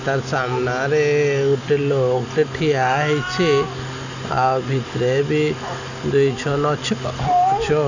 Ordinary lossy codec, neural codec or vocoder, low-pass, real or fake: none; none; 7.2 kHz; real